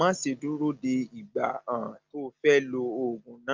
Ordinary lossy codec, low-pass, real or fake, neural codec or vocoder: Opus, 24 kbps; 7.2 kHz; real; none